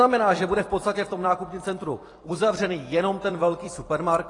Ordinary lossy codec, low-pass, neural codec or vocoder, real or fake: AAC, 32 kbps; 10.8 kHz; vocoder, 44.1 kHz, 128 mel bands every 256 samples, BigVGAN v2; fake